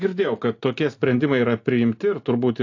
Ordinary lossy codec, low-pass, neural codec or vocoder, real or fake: AAC, 48 kbps; 7.2 kHz; none; real